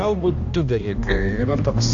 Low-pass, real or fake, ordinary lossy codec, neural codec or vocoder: 7.2 kHz; fake; AAC, 64 kbps; codec, 16 kHz, 1 kbps, X-Codec, HuBERT features, trained on balanced general audio